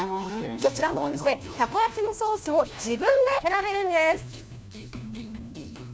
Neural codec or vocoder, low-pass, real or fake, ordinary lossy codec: codec, 16 kHz, 1 kbps, FunCodec, trained on LibriTTS, 50 frames a second; none; fake; none